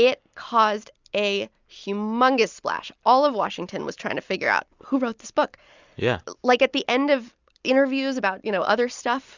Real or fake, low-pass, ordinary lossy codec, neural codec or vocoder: real; 7.2 kHz; Opus, 64 kbps; none